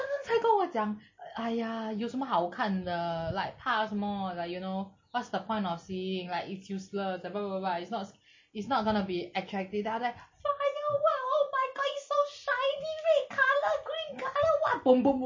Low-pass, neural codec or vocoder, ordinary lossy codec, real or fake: 7.2 kHz; none; MP3, 32 kbps; real